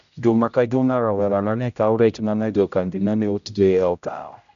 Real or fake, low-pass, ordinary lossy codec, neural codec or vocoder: fake; 7.2 kHz; none; codec, 16 kHz, 0.5 kbps, X-Codec, HuBERT features, trained on general audio